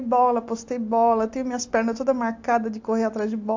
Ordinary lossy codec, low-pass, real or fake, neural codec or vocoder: AAC, 48 kbps; 7.2 kHz; real; none